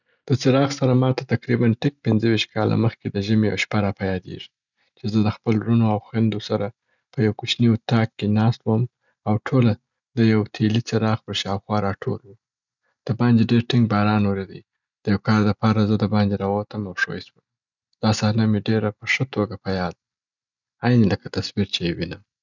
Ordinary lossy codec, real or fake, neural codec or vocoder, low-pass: none; real; none; 7.2 kHz